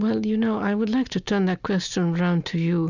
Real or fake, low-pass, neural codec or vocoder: real; 7.2 kHz; none